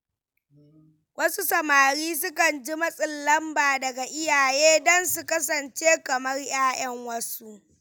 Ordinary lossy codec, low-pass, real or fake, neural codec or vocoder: none; none; real; none